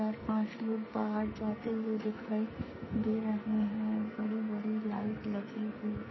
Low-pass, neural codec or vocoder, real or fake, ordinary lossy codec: 7.2 kHz; codec, 44.1 kHz, 2.6 kbps, SNAC; fake; MP3, 24 kbps